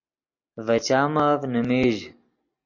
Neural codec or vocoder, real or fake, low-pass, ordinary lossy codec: none; real; 7.2 kHz; MP3, 48 kbps